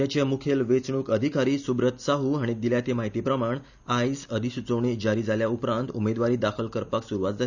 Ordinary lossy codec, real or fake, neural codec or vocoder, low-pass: none; real; none; 7.2 kHz